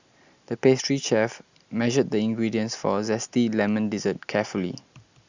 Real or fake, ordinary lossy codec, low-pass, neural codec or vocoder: real; Opus, 64 kbps; 7.2 kHz; none